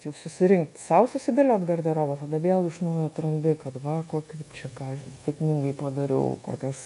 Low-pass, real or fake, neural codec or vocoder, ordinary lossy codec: 10.8 kHz; fake; codec, 24 kHz, 1.2 kbps, DualCodec; AAC, 64 kbps